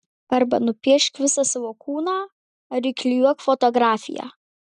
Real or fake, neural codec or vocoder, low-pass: real; none; 10.8 kHz